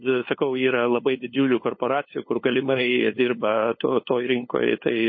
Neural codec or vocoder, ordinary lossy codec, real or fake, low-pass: codec, 16 kHz, 8 kbps, FunCodec, trained on LibriTTS, 25 frames a second; MP3, 24 kbps; fake; 7.2 kHz